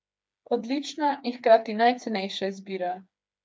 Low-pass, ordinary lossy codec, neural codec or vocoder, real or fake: none; none; codec, 16 kHz, 4 kbps, FreqCodec, smaller model; fake